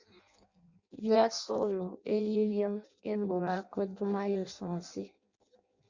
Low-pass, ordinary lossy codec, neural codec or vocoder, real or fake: 7.2 kHz; Opus, 64 kbps; codec, 16 kHz in and 24 kHz out, 0.6 kbps, FireRedTTS-2 codec; fake